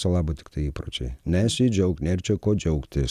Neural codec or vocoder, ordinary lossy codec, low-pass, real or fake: none; Opus, 64 kbps; 14.4 kHz; real